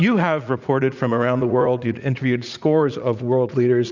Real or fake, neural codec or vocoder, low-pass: fake; vocoder, 44.1 kHz, 80 mel bands, Vocos; 7.2 kHz